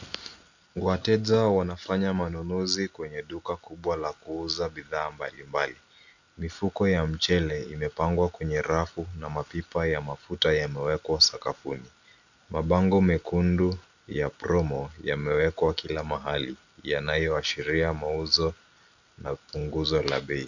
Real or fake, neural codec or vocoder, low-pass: real; none; 7.2 kHz